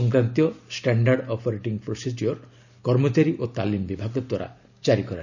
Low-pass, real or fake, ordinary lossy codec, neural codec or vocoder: 7.2 kHz; real; none; none